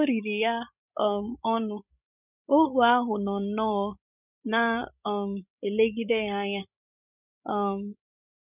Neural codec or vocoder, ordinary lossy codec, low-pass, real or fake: codec, 16 kHz, 16 kbps, FreqCodec, larger model; none; 3.6 kHz; fake